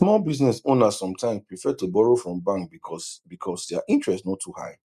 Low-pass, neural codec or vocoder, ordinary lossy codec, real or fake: 14.4 kHz; none; none; real